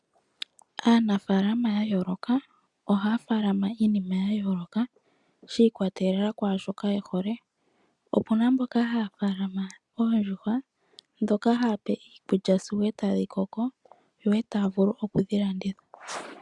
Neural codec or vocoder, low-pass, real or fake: none; 10.8 kHz; real